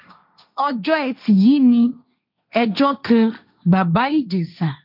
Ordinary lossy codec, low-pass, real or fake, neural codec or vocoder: none; 5.4 kHz; fake; codec, 16 kHz, 1.1 kbps, Voila-Tokenizer